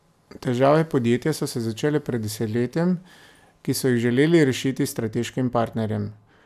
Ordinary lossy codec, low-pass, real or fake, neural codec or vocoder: none; 14.4 kHz; real; none